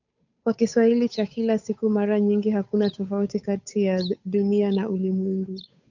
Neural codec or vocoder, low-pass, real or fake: codec, 16 kHz, 8 kbps, FunCodec, trained on Chinese and English, 25 frames a second; 7.2 kHz; fake